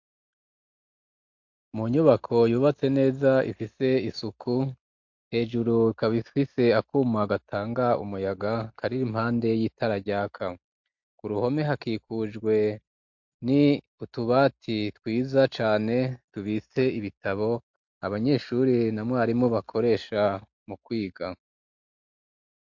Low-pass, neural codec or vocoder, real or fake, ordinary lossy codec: 7.2 kHz; none; real; MP3, 48 kbps